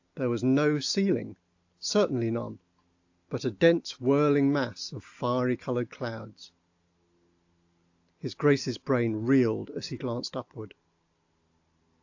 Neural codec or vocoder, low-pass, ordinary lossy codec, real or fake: none; 7.2 kHz; AAC, 48 kbps; real